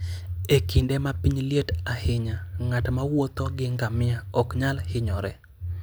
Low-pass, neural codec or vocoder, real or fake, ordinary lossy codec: none; none; real; none